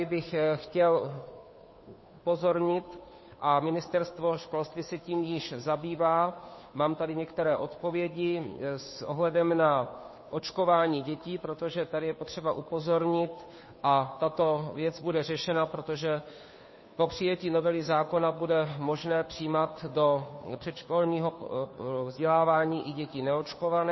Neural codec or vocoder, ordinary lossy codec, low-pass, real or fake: codec, 16 kHz, 2 kbps, FunCodec, trained on Chinese and English, 25 frames a second; MP3, 24 kbps; 7.2 kHz; fake